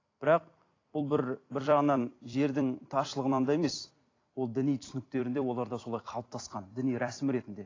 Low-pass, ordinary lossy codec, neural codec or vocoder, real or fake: 7.2 kHz; AAC, 32 kbps; vocoder, 44.1 kHz, 128 mel bands every 256 samples, BigVGAN v2; fake